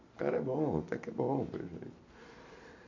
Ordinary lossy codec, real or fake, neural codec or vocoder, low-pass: MP3, 64 kbps; real; none; 7.2 kHz